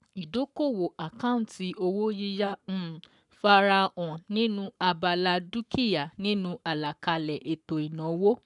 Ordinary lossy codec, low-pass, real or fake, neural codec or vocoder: none; 10.8 kHz; fake; codec, 44.1 kHz, 7.8 kbps, Pupu-Codec